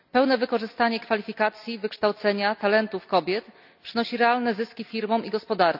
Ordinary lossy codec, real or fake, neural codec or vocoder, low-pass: none; real; none; 5.4 kHz